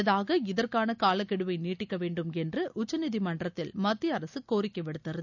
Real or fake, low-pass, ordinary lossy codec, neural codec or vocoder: real; 7.2 kHz; none; none